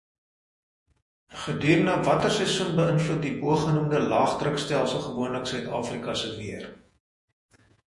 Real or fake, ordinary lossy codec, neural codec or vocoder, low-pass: fake; MP3, 48 kbps; vocoder, 48 kHz, 128 mel bands, Vocos; 10.8 kHz